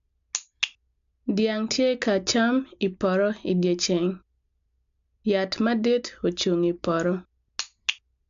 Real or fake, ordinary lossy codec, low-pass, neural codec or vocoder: real; AAC, 64 kbps; 7.2 kHz; none